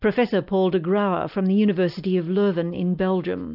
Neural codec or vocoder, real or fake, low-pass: none; real; 5.4 kHz